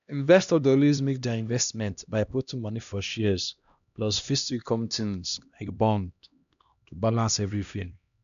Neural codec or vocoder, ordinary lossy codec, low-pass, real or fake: codec, 16 kHz, 1 kbps, X-Codec, HuBERT features, trained on LibriSpeech; none; 7.2 kHz; fake